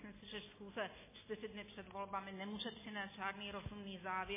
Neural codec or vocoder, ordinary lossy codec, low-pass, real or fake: none; MP3, 16 kbps; 3.6 kHz; real